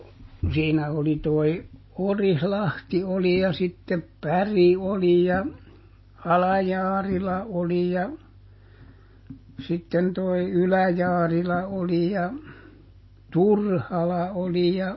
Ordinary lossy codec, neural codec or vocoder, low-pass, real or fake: MP3, 24 kbps; none; 7.2 kHz; real